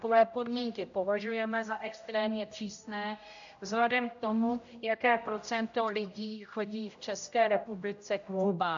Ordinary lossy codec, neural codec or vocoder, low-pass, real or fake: AAC, 64 kbps; codec, 16 kHz, 0.5 kbps, X-Codec, HuBERT features, trained on general audio; 7.2 kHz; fake